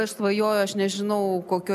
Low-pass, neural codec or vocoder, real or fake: 14.4 kHz; none; real